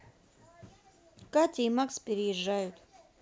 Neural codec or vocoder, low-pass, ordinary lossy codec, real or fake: none; none; none; real